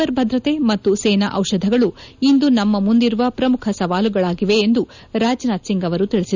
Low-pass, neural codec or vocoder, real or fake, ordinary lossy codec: 7.2 kHz; none; real; none